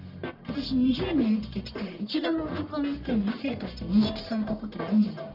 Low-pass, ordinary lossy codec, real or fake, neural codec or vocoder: 5.4 kHz; none; fake; codec, 44.1 kHz, 1.7 kbps, Pupu-Codec